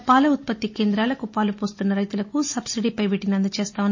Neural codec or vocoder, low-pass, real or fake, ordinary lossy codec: none; 7.2 kHz; real; MP3, 32 kbps